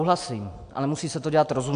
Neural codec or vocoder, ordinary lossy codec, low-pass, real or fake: none; AAC, 64 kbps; 9.9 kHz; real